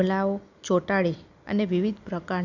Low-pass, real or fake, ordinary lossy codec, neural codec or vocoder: 7.2 kHz; real; none; none